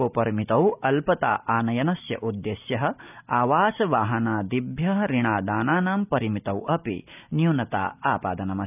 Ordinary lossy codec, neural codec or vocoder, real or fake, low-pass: none; none; real; 3.6 kHz